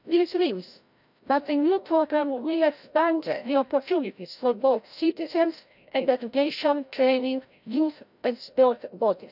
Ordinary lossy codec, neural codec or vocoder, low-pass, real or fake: none; codec, 16 kHz, 0.5 kbps, FreqCodec, larger model; 5.4 kHz; fake